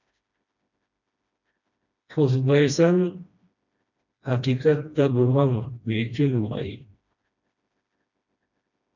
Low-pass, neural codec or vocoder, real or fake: 7.2 kHz; codec, 16 kHz, 1 kbps, FreqCodec, smaller model; fake